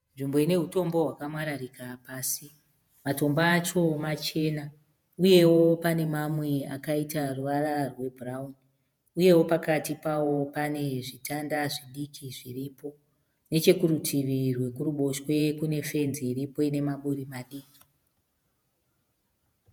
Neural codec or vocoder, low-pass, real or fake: vocoder, 48 kHz, 128 mel bands, Vocos; 19.8 kHz; fake